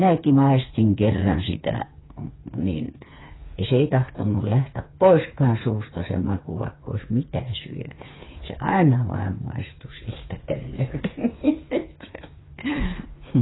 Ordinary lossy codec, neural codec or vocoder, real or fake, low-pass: AAC, 16 kbps; codec, 16 kHz, 4 kbps, FreqCodec, smaller model; fake; 7.2 kHz